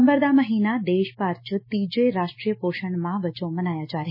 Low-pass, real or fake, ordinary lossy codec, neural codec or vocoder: 5.4 kHz; real; MP3, 24 kbps; none